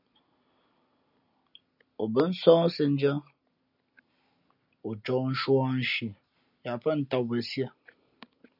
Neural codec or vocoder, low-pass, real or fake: none; 5.4 kHz; real